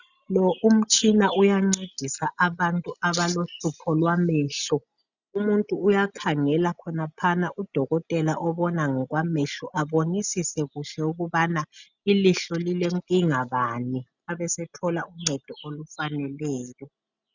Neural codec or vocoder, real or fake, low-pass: none; real; 7.2 kHz